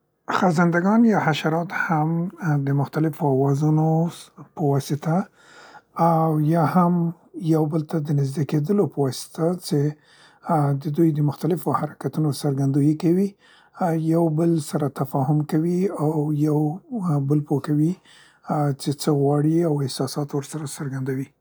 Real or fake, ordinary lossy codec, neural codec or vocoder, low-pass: real; none; none; none